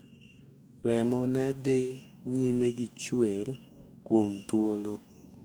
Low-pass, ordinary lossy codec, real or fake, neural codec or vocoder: none; none; fake; codec, 44.1 kHz, 2.6 kbps, DAC